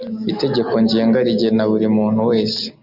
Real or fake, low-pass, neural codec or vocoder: real; 5.4 kHz; none